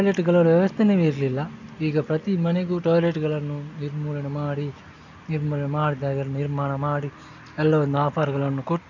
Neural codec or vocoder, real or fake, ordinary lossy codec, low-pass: none; real; none; 7.2 kHz